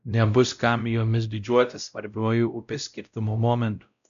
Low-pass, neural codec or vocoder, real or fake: 7.2 kHz; codec, 16 kHz, 0.5 kbps, X-Codec, WavLM features, trained on Multilingual LibriSpeech; fake